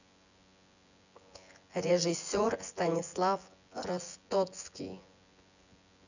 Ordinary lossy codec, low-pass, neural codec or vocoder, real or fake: none; 7.2 kHz; vocoder, 24 kHz, 100 mel bands, Vocos; fake